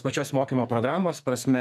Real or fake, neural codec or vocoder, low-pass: fake; codec, 44.1 kHz, 2.6 kbps, SNAC; 14.4 kHz